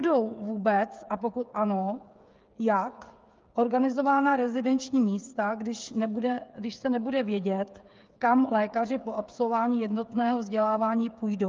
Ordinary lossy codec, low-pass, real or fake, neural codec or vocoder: Opus, 24 kbps; 7.2 kHz; fake; codec, 16 kHz, 8 kbps, FreqCodec, smaller model